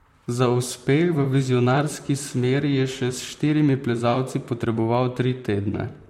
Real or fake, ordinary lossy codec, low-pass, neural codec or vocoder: fake; MP3, 64 kbps; 19.8 kHz; vocoder, 44.1 kHz, 128 mel bands, Pupu-Vocoder